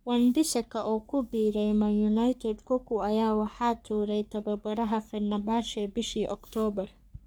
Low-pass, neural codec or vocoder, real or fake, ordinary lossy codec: none; codec, 44.1 kHz, 3.4 kbps, Pupu-Codec; fake; none